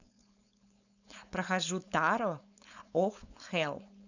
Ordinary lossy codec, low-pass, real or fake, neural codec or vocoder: none; 7.2 kHz; fake; codec, 16 kHz, 4.8 kbps, FACodec